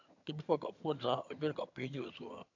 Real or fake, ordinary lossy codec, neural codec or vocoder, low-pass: fake; AAC, 48 kbps; vocoder, 22.05 kHz, 80 mel bands, HiFi-GAN; 7.2 kHz